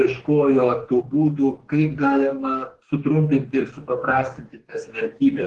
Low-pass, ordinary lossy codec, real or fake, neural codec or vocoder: 10.8 kHz; Opus, 16 kbps; fake; codec, 44.1 kHz, 2.6 kbps, DAC